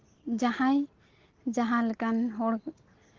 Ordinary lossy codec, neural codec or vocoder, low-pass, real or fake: Opus, 16 kbps; none; 7.2 kHz; real